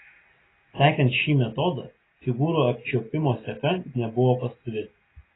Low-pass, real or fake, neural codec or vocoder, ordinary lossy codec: 7.2 kHz; real; none; AAC, 16 kbps